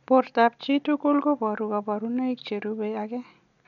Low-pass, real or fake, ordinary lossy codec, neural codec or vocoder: 7.2 kHz; real; none; none